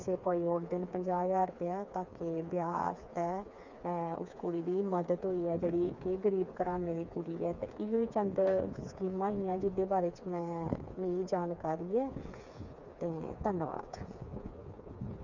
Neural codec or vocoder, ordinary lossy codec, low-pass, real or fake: codec, 16 kHz, 4 kbps, FreqCodec, smaller model; none; 7.2 kHz; fake